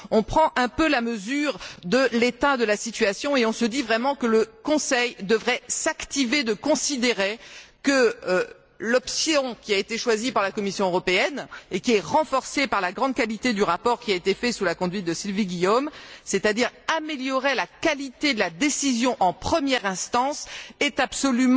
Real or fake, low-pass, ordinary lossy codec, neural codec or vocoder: real; none; none; none